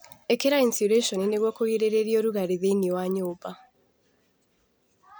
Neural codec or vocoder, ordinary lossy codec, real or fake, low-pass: none; none; real; none